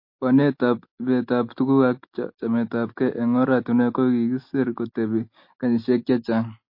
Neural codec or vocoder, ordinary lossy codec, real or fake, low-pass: none; MP3, 32 kbps; real; 5.4 kHz